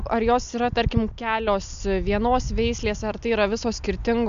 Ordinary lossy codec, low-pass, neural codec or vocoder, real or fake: MP3, 64 kbps; 7.2 kHz; none; real